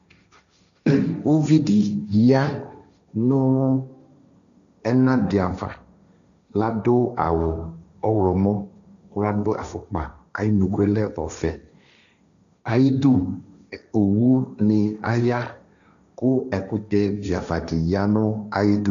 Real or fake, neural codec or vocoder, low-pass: fake; codec, 16 kHz, 1.1 kbps, Voila-Tokenizer; 7.2 kHz